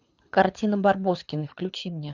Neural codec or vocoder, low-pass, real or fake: codec, 24 kHz, 6 kbps, HILCodec; 7.2 kHz; fake